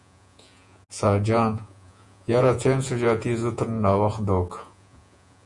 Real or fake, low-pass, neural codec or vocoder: fake; 10.8 kHz; vocoder, 48 kHz, 128 mel bands, Vocos